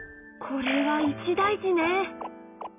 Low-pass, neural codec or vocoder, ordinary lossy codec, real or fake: 3.6 kHz; none; none; real